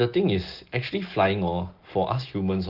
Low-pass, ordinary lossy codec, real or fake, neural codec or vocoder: 5.4 kHz; Opus, 16 kbps; real; none